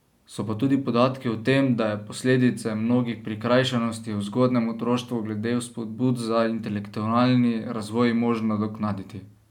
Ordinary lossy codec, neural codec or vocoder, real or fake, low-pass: none; none; real; 19.8 kHz